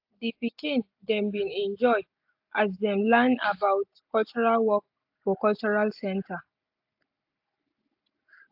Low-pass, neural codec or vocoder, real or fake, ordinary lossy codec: 5.4 kHz; none; real; AAC, 48 kbps